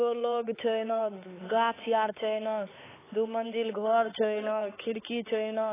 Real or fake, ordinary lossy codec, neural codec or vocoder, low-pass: fake; AAC, 16 kbps; codec, 16 kHz, 4 kbps, X-Codec, HuBERT features, trained on LibriSpeech; 3.6 kHz